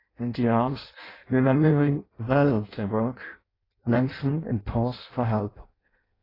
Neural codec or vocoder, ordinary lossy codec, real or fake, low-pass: codec, 16 kHz in and 24 kHz out, 0.6 kbps, FireRedTTS-2 codec; AAC, 24 kbps; fake; 5.4 kHz